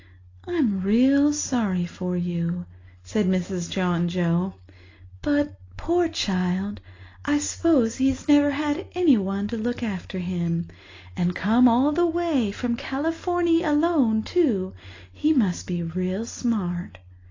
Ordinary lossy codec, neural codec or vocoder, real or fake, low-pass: AAC, 32 kbps; none; real; 7.2 kHz